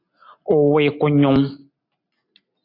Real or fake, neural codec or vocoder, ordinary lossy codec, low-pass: real; none; AAC, 32 kbps; 5.4 kHz